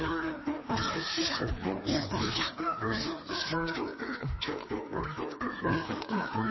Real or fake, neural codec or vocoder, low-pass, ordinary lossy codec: fake; codec, 16 kHz, 2 kbps, FreqCodec, larger model; 7.2 kHz; MP3, 24 kbps